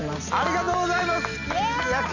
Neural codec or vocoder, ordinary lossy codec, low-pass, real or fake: none; none; 7.2 kHz; real